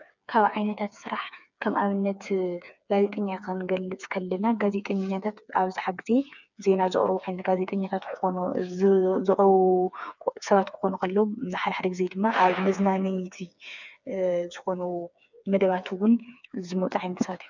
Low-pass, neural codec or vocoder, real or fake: 7.2 kHz; codec, 16 kHz, 4 kbps, FreqCodec, smaller model; fake